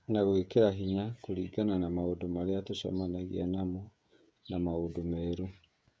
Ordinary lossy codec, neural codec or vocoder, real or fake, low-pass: none; codec, 16 kHz, 16 kbps, FreqCodec, smaller model; fake; none